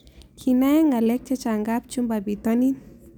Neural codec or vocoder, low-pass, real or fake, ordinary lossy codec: none; none; real; none